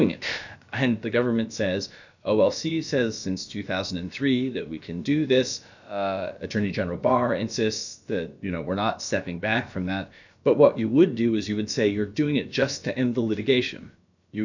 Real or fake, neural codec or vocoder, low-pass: fake; codec, 16 kHz, about 1 kbps, DyCAST, with the encoder's durations; 7.2 kHz